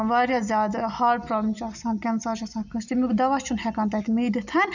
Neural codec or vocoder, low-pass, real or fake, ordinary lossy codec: none; 7.2 kHz; real; none